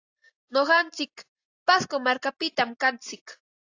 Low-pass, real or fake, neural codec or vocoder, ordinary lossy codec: 7.2 kHz; real; none; AAC, 48 kbps